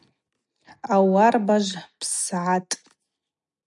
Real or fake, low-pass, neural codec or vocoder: real; 10.8 kHz; none